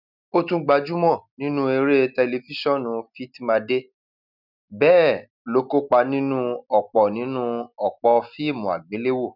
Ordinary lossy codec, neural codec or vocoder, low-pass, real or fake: none; none; 5.4 kHz; real